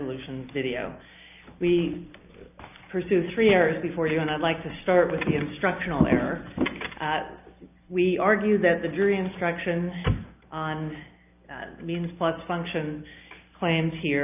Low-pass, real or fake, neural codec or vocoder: 3.6 kHz; real; none